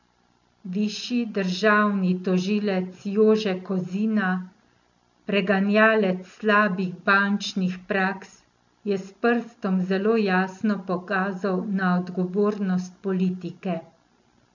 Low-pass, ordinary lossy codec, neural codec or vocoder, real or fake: 7.2 kHz; none; none; real